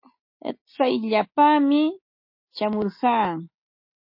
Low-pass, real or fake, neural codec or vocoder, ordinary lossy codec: 5.4 kHz; real; none; MP3, 32 kbps